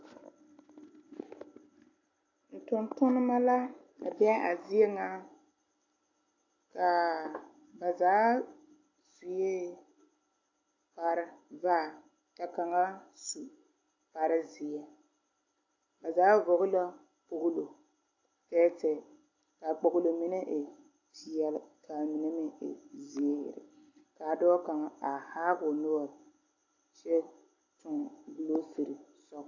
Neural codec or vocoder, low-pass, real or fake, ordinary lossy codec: none; 7.2 kHz; real; AAC, 48 kbps